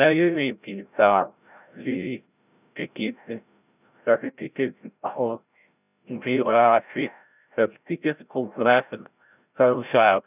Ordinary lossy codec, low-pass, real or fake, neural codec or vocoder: none; 3.6 kHz; fake; codec, 16 kHz, 0.5 kbps, FreqCodec, larger model